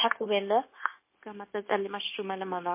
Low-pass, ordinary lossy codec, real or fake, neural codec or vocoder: 3.6 kHz; MP3, 24 kbps; fake; codec, 16 kHz, 0.9 kbps, LongCat-Audio-Codec